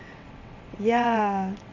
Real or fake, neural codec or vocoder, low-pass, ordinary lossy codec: fake; vocoder, 22.05 kHz, 80 mel bands, WaveNeXt; 7.2 kHz; none